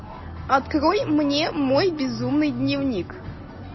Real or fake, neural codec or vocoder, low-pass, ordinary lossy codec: real; none; 7.2 kHz; MP3, 24 kbps